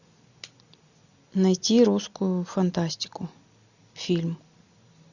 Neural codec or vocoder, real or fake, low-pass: none; real; 7.2 kHz